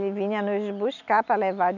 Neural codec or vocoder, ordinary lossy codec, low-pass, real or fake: none; none; 7.2 kHz; real